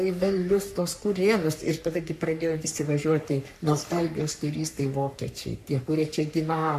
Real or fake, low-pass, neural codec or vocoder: fake; 14.4 kHz; codec, 44.1 kHz, 3.4 kbps, Pupu-Codec